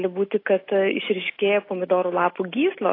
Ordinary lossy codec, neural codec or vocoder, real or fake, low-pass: AAC, 24 kbps; none; real; 5.4 kHz